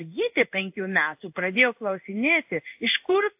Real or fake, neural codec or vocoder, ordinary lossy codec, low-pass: fake; codec, 16 kHz in and 24 kHz out, 1 kbps, XY-Tokenizer; AAC, 32 kbps; 3.6 kHz